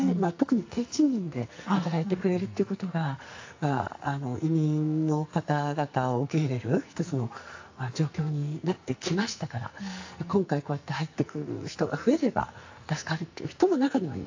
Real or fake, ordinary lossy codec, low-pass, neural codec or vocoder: fake; AAC, 48 kbps; 7.2 kHz; codec, 44.1 kHz, 2.6 kbps, SNAC